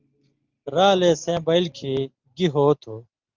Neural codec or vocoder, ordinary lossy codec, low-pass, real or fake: none; Opus, 24 kbps; 7.2 kHz; real